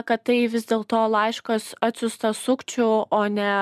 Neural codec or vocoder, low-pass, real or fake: none; 14.4 kHz; real